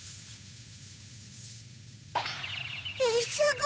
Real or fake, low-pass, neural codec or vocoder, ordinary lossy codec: fake; none; codec, 16 kHz, 8 kbps, FunCodec, trained on Chinese and English, 25 frames a second; none